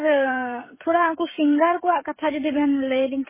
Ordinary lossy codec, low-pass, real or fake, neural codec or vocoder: MP3, 16 kbps; 3.6 kHz; fake; codec, 16 kHz, 16 kbps, FreqCodec, smaller model